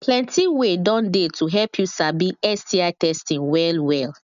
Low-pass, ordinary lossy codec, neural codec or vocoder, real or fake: 7.2 kHz; none; none; real